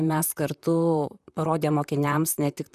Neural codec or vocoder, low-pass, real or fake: vocoder, 44.1 kHz, 128 mel bands, Pupu-Vocoder; 14.4 kHz; fake